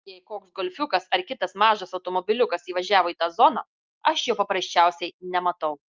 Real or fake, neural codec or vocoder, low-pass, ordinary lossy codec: real; none; 7.2 kHz; Opus, 24 kbps